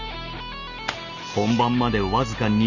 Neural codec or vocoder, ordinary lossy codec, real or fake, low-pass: none; none; real; 7.2 kHz